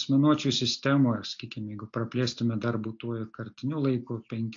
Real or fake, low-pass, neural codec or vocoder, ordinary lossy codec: real; 7.2 kHz; none; AAC, 48 kbps